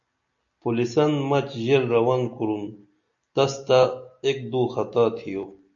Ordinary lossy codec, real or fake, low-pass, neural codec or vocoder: AAC, 64 kbps; real; 7.2 kHz; none